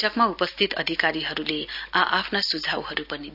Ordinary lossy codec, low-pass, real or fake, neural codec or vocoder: none; 5.4 kHz; real; none